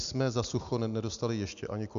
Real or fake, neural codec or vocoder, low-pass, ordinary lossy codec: real; none; 7.2 kHz; MP3, 96 kbps